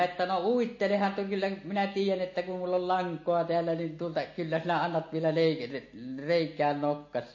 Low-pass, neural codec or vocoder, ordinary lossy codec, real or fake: 7.2 kHz; none; MP3, 32 kbps; real